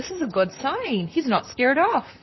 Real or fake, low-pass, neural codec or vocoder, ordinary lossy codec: fake; 7.2 kHz; vocoder, 44.1 kHz, 128 mel bands, Pupu-Vocoder; MP3, 24 kbps